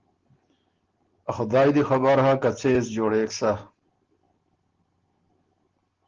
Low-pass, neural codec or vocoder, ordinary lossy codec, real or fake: 7.2 kHz; none; Opus, 16 kbps; real